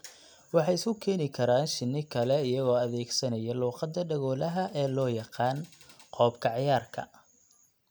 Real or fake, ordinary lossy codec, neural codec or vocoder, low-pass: real; none; none; none